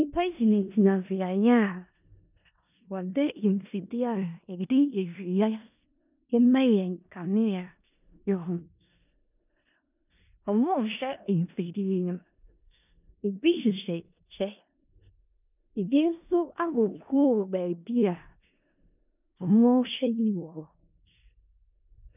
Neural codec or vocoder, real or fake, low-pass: codec, 16 kHz in and 24 kHz out, 0.4 kbps, LongCat-Audio-Codec, four codebook decoder; fake; 3.6 kHz